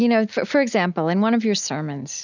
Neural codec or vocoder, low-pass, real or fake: none; 7.2 kHz; real